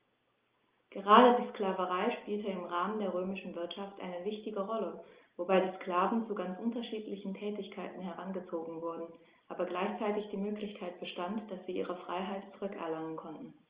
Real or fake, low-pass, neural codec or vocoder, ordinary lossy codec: real; 3.6 kHz; none; Opus, 32 kbps